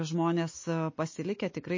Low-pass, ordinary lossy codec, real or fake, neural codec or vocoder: 7.2 kHz; MP3, 32 kbps; real; none